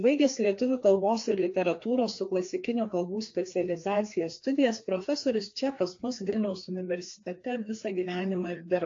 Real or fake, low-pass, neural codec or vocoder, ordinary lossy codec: fake; 7.2 kHz; codec, 16 kHz, 2 kbps, FreqCodec, larger model; AAC, 48 kbps